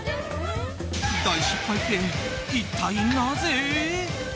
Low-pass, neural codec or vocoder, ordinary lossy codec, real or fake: none; none; none; real